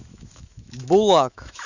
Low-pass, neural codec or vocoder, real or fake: 7.2 kHz; autoencoder, 48 kHz, 128 numbers a frame, DAC-VAE, trained on Japanese speech; fake